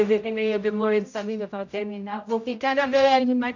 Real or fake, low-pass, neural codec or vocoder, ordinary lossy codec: fake; 7.2 kHz; codec, 16 kHz, 0.5 kbps, X-Codec, HuBERT features, trained on general audio; none